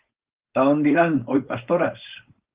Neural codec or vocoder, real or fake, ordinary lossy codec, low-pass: codec, 16 kHz, 4.8 kbps, FACodec; fake; Opus, 32 kbps; 3.6 kHz